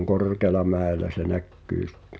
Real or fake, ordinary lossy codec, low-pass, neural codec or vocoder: real; none; none; none